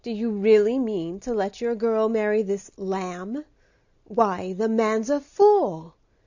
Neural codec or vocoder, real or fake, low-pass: none; real; 7.2 kHz